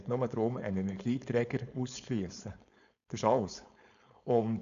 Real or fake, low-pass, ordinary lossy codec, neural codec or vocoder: fake; 7.2 kHz; none; codec, 16 kHz, 4.8 kbps, FACodec